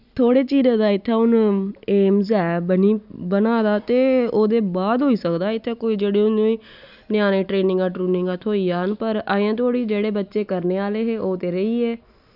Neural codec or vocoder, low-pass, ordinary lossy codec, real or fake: none; 5.4 kHz; none; real